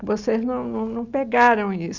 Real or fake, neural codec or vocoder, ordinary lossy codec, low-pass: real; none; none; 7.2 kHz